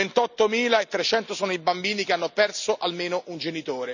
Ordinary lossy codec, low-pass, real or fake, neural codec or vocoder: none; 7.2 kHz; real; none